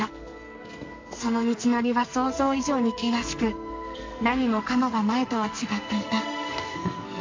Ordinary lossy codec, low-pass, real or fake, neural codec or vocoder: MP3, 48 kbps; 7.2 kHz; fake; codec, 44.1 kHz, 2.6 kbps, SNAC